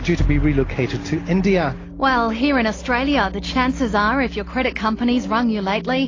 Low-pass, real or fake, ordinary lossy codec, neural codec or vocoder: 7.2 kHz; real; AAC, 32 kbps; none